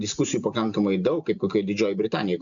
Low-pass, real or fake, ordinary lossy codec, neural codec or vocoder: 7.2 kHz; real; AAC, 64 kbps; none